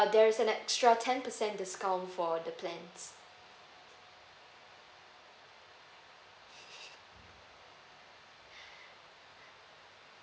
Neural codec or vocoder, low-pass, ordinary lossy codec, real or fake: none; none; none; real